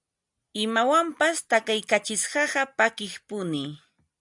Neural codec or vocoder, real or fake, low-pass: none; real; 10.8 kHz